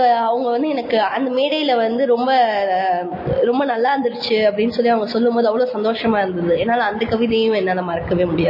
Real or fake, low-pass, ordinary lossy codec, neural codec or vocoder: real; 5.4 kHz; MP3, 24 kbps; none